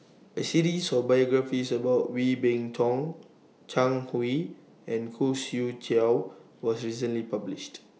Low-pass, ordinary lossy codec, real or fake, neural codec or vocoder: none; none; real; none